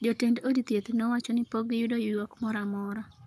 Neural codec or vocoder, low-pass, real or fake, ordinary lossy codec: codec, 44.1 kHz, 7.8 kbps, Pupu-Codec; 14.4 kHz; fake; none